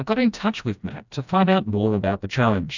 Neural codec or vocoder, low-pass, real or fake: codec, 16 kHz, 1 kbps, FreqCodec, smaller model; 7.2 kHz; fake